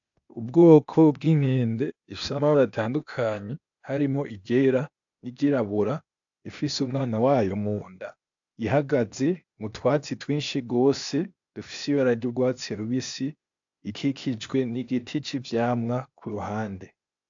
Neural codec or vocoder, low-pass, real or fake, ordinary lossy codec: codec, 16 kHz, 0.8 kbps, ZipCodec; 7.2 kHz; fake; AAC, 64 kbps